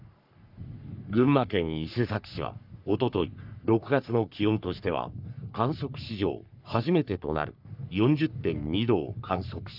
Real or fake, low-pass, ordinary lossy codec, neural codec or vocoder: fake; 5.4 kHz; none; codec, 44.1 kHz, 3.4 kbps, Pupu-Codec